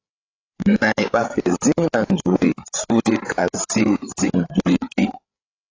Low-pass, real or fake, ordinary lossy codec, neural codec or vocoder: 7.2 kHz; fake; AAC, 32 kbps; codec, 16 kHz, 8 kbps, FreqCodec, larger model